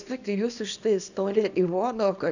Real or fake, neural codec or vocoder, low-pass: fake; codec, 24 kHz, 0.9 kbps, WavTokenizer, small release; 7.2 kHz